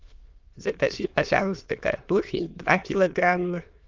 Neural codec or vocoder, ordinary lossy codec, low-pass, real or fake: autoencoder, 22.05 kHz, a latent of 192 numbers a frame, VITS, trained on many speakers; Opus, 24 kbps; 7.2 kHz; fake